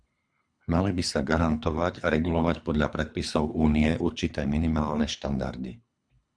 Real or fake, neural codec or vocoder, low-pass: fake; codec, 24 kHz, 3 kbps, HILCodec; 9.9 kHz